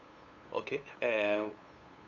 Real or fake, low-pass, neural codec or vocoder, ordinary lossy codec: fake; 7.2 kHz; codec, 16 kHz, 8 kbps, FunCodec, trained on LibriTTS, 25 frames a second; AAC, 48 kbps